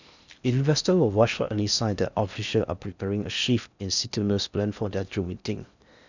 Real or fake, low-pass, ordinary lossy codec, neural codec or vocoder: fake; 7.2 kHz; none; codec, 16 kHz in and 24 kHz out, 0.6 kbps, FocalCodec, streaming, 4096 codes